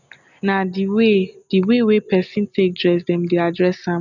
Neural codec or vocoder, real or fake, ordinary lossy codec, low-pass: none; real; none; 7.2 kHz